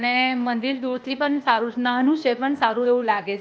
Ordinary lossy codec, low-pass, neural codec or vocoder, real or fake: none; none; codec, 16 kHz, 0.8 kbps, ZipCodec; fake